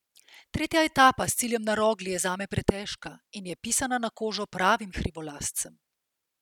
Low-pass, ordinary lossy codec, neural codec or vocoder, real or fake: 19.8 kHz; none; none; real